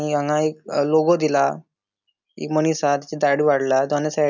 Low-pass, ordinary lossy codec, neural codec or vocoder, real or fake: 7.2 kHz; none; none; real